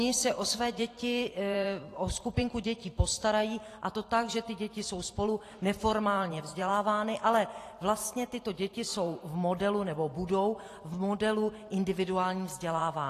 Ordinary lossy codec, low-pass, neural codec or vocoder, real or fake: AAC, 48 kbps; 14.4 kHz; vocoder, 44.1 kHz, 128 mel bands every 512 samples, BigVGAN v2; fake